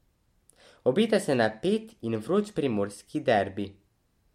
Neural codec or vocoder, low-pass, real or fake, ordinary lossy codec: none; 19.8 kHz; real; MP3, 64 kbps